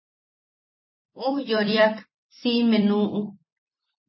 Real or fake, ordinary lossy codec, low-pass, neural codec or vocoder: fake; MP3, 24 kbps; 7.2 kHz; vocoder, 44.1 kHz, 128 mel bands every 256 samples, BigVGAN v2